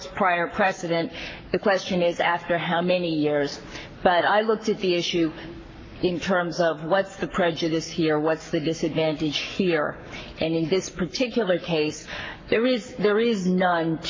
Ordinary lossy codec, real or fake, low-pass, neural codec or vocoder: MP3, 32 kbps; fake; 7.2 kHz; codec, 44.1 kHz, 7.8 kbps, Pupu-Codec